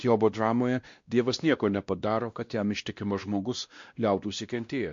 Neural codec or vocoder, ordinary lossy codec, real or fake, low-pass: codec, 16 kHz, 1 kbps, X-Codec, WavLM features, trained on Multilingual LibriSpeech; MP3, 48 kbps; fake; 7.2 kHz